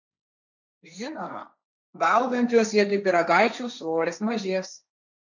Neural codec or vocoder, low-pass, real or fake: codec, 16 kHz, 1.1 kbps, Voila-Tokenizer; 7.2 kHz; fake